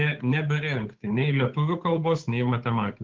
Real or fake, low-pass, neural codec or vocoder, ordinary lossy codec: fake; 7.2 kHz; codec, 16 kHz, 8 kbps, FunCodec, trained on Chinese and English, 25 frames a second; Opus, 16 kbps